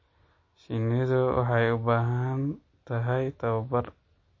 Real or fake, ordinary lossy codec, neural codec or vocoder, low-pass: real; MP3, 32 kbps; none; 7.2 kHz